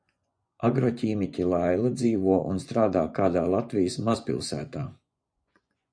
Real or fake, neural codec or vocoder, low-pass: fake; vocoder, 24 kHz, 100 mel bands, Vocos; 9.9 kHz